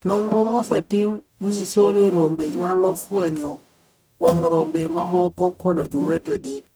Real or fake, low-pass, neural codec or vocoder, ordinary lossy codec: fake; none; codec, 44.1 kHz, 0.9 kbps, DAC; none